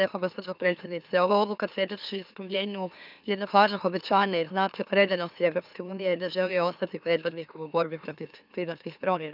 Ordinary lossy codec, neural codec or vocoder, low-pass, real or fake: none; autoencoder, 44.1 kHz, a latent of 192 numbers a frame, MeloTTS; 5.4 kHz; fake